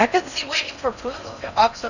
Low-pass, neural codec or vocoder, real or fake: 7.2 kHz; codec, 16 kHz in and 24 kHz out, 0.6 kbps, FocalCodec, streaming, 4096 codes; fake